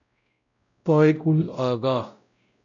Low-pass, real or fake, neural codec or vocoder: 7.2 kHz; fake; codec, 16 kHz, 0.5 kbps, X-Codec, WavLM features, trained on Multilingual LibriSpeech